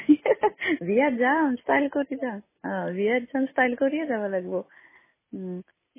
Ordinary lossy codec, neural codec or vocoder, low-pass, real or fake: MP3, 16 kbps; none; 3.6 kHz; real